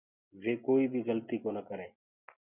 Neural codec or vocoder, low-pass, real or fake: none; 3.6 kHz; real